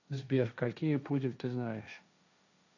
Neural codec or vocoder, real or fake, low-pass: codec, 16 kHz, 1.1 kbps, Voila-Tokenizer; fake; 7.2 kHz